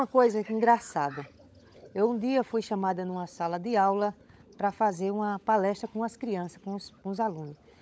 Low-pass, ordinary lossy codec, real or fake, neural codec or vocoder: none; none; fake; codec, 16 kHz, 16 kbps, FunCodec, trained on LibriTTS, 50 frames a second